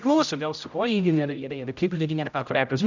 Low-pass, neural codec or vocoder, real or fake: 7.2 kHz; codec, 16 kHz, 0.5 kbps, X-Codec, HuBERT features, trained on general audio; fake